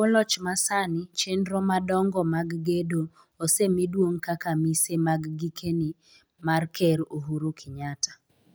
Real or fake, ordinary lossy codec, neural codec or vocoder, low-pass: real; none; none; none